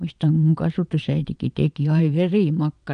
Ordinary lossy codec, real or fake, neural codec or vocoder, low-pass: none; fake; vocoder, 22.05 kHz, 80 mel bands, Vocos; 9.9 kHz